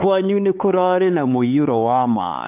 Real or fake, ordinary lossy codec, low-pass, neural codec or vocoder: fake; AAC, 32 kbps; 3.6 kHz; codec, 16 kHz, 4 kbps, X-Codec, HuBERT features, trained on LibriSpeech